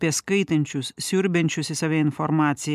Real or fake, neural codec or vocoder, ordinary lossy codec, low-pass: real; none; MP3, 96 kbps; 14.4 kHz